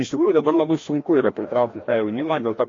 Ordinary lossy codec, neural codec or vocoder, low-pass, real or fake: AAC, 32 kbps; codec, 16 kHz, 1 kbps, FreqCodec, larger model; 7.2 kHz; fake